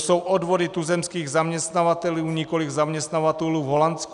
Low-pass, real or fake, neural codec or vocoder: 10.8 kHz; real; none